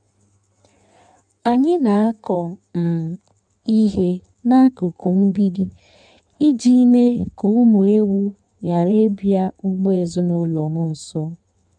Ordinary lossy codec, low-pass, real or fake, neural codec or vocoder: none; 9.9 kHz; fake; codec, 16 kHz in and 24 kHz out, 1.1 kbps, FireRedTTS-2 codec